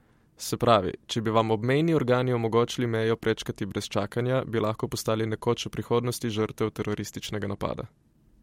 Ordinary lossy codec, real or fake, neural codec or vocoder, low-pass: MP3, 64 kbps; real; none; 19.8 kHz